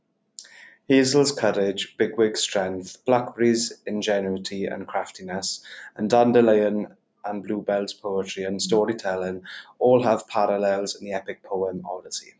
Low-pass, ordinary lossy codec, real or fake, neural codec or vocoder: none; none; real; none